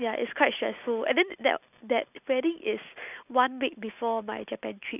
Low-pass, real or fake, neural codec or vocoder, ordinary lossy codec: 3.6 kHz; real; none; none